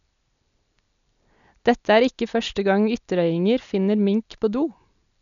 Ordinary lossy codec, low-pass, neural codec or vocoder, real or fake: none; 7.2 kHz; none; real